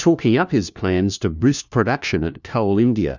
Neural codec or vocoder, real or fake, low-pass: codec, 16 kHz, 1 kbps, FunCodec, trained on LibriTTS, 50 frames a second; fake; 7.2 kHz